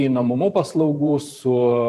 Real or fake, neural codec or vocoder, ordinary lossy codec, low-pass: fake; vocoder, 44.1 kHz, 128 mel bands every 512 samples, BigVGAN v2; AAC, 64 kbps; 14.4 kHz